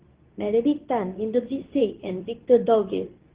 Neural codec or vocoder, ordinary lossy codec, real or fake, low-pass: codec, 24 kHz, 0.9 kbps, WavTokenizer, medium speech release version 2; Opus, 16 kbps; fake; 3.6 kHz